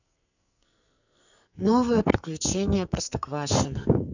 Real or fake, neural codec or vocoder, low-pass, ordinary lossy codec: fake; codec, 44.1 kHz, 2.6 kbps, SNAC; 7.2 kHz; none